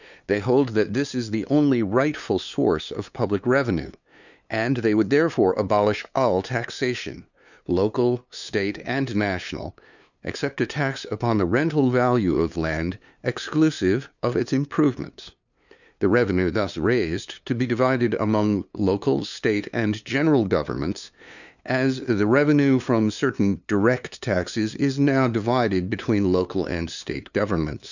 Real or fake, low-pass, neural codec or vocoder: fake; 7.2 kHz; codec, 16 kHz, 2 kbps, FunCodec, trained on LibriTTS, 25 frames a second